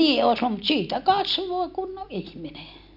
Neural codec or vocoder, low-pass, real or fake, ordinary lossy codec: none; 5.4 kHz; real; none